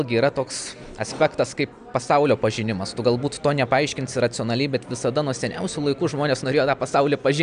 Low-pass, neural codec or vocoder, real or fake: 9.9 kHz; none; real